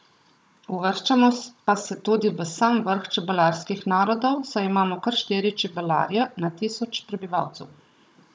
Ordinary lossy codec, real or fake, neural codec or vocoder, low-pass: none; fake; codec, 16 kHz, 16 kbps, FunCodec, trained on Chinese and English, 50 frames a second; none